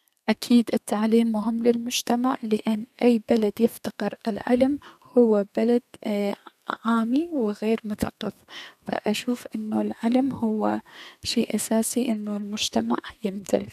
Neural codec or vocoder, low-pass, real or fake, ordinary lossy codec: codec, 32 kHz, 1.9 kbps, SNAC; 14.4 kHz; fake; none